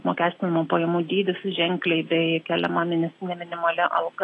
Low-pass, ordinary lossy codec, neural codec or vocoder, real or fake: 10.8 kHz; AAC, 32 kbps; none; real